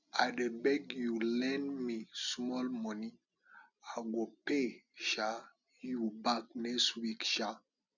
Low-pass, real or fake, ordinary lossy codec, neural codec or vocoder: 7.2 kHz; real; none; none